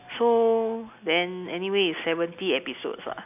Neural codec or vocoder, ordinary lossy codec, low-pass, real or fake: none; none; 3.6 kHz; real